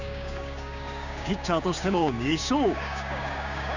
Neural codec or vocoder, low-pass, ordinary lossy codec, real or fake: codec, 16 kHz, 6 kbps, DAC; 7.2 kHz; none; fake